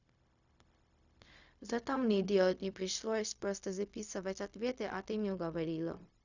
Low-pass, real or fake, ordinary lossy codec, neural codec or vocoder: 7.2 kHz; fake; none; codec, 16 kHz, 0.4 kbps, LongCat-Audio-Codec